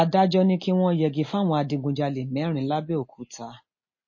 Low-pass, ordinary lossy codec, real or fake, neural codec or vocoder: 7.2 kHz; MP3, 32 kbps; real; none